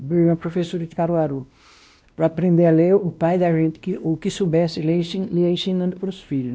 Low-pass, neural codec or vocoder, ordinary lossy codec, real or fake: none; codec, 16 kHz, 1 kbps, X-Codec, WavLM features, trained on Multilingual LibriSpeech; none; fake